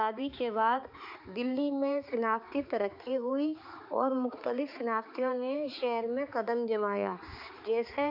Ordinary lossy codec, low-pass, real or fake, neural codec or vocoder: MP3, 48 kbps; 5.4 kHz; fake; codec, 16 kHz, 4 kbps, X-Codec, HuBERT features, trained on balanced general audio